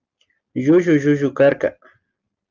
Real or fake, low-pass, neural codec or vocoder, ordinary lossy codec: real; 7.2 kHz; none; Opus, 32 kbps